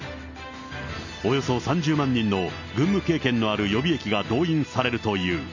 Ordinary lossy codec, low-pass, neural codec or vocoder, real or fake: none; 7.2 kHz; none; real